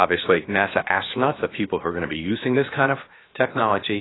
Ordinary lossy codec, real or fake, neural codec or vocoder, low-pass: AAC, 16 kbps; fake; codec, 16 kHz, 0.7 kbps, FocalCodec; 7.2 kHz